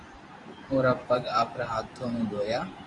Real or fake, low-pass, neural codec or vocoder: fake; 10.8 kHz; vocoder, 44.1 kHz, 128 mel bands every 512 samples, BigVGAN v2